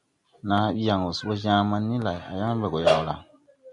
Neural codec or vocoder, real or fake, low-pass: none; real; 10.8 kHz